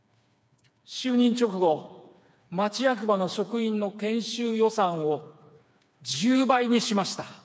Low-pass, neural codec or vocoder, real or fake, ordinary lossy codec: none; codec, 16 kHz, 4 kbps, FreqCodec, smaller model; fake; none